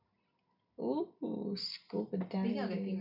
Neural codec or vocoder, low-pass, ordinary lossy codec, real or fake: none; 5.4 kHz; none; real